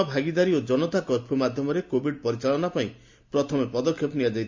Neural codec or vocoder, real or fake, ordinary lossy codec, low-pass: none; real; MP3, 48 kbps; 7.2 kHz